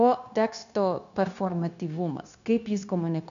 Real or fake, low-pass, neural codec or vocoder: fake; 7.2 kHz; codec, 16 kHz, 0.9 kbps, LongCat-Audio-Codec